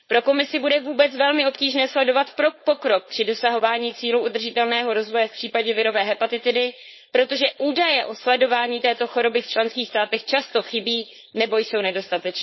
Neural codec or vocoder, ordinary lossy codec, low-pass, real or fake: codec, 16 kHz, 4.8 kbps, FACodec; MP3, 24 kbps; 7.2 kHz; fake